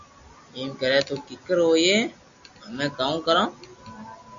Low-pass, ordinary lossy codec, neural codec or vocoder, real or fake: 7.2 kHz; MP3, 64 kbps; none; real